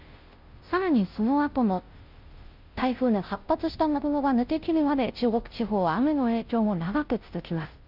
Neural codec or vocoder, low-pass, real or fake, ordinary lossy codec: codec, 16 kHz, 0.5 kbps, FunCodec, trained on Chinese and English, 25 frames a second; 5.4 kHz; fake; Opus, 24 kbps